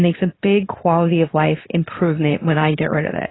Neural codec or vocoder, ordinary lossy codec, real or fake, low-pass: codec, 24 kHz, 0.9 kbps, WavTokenizer, medium speech release version 2; AAC, 16 kbps; fake; 7.2 kHz